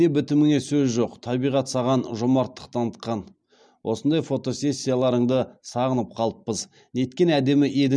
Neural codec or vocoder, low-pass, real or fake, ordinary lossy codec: none; none; real; none